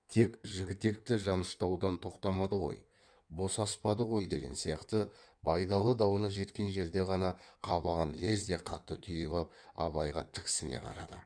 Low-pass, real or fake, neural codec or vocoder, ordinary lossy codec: 9.9 kHz; fake; codec, 16 kHz in and 24 kHz out, 1.1 kbps, FireRedTTS-2 codec; none